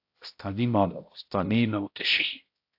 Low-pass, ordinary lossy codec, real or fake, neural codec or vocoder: 5.4 kHz; AAC, 32 kbps; fake; codec, 16 kHz, 0.5 kbps, X-Codec, HuBERT features, trained on balanced general audio